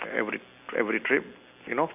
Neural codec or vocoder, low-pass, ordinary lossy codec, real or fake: none; 3.6 kHz; none; real